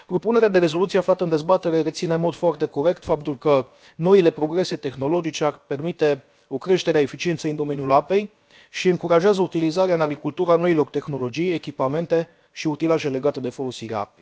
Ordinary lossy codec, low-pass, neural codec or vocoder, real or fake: none; none; codec, 16 kHz, about 1 kbps, DyCAST, with the encoder's durations; fake